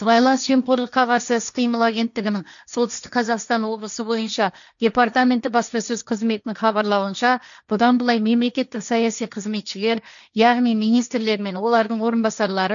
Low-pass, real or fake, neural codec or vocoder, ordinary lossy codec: 7.2 kHz; fake; codec, 16 kHz, 1.1 kbps, Voila-Tokenizer; none